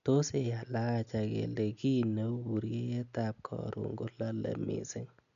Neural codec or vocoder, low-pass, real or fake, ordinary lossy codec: none; 7.2 kHz; real; none